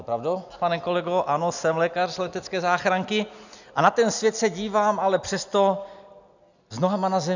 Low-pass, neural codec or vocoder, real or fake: 7.2 kHz; none; real